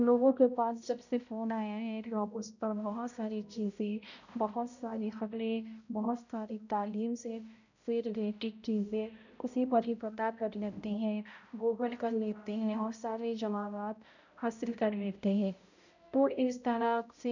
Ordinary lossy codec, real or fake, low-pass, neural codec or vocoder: none; fake; 7.2 kHz; codec, 16 kHz, 0.5 kbps, X-Codec, HuBERT features, trained on balanced general audio